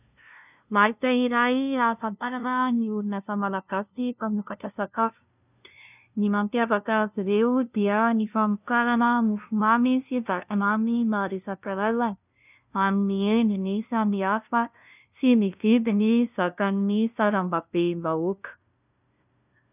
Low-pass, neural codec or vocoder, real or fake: 3.6 kHz; codec, 16 kHz, 0.5 kbps, FunCodec, trained on LibriTTS, 25 frames a second; fake